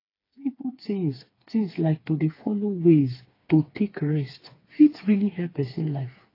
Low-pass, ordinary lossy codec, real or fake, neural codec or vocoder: 5.4 kHz; AAC, 24 kbps; fake; codec, 16 kHz, 4 kbps, FreqCodec, smaller model